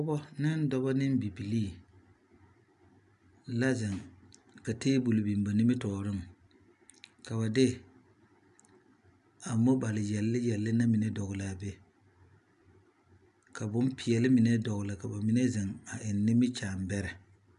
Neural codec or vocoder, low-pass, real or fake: none; 10.8 kHz; real